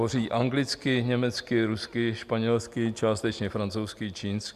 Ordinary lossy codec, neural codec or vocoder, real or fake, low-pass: Opus, 64 kbps; none; real; 14.4 kHz